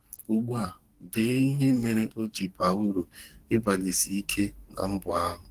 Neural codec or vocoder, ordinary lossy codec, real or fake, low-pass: codec, 44.1 kHz, 2.6 kbps, SNAC; Opus, 24 kbps; fake; 14.4 kHz